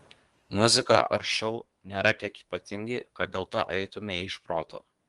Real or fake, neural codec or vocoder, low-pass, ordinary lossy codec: fake; codec, 24 kHz, 1 kbps, SNAC; 10.8 kHz; Opus, 32 kbps